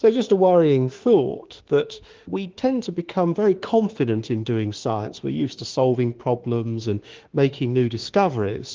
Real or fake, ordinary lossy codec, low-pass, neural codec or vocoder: fake; Opus, 16 kbps; 7.2 kHz; autoencoder, 48 kHz, 32 numbers a frame, DAC-VAE, trained on Japanese speech